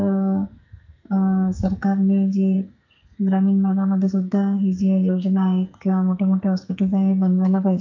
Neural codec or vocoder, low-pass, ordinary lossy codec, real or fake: codec, 44.1 kHz, 2.6 kbps, SNAC; 7.2 kHz; MP3, 64 kbps; fake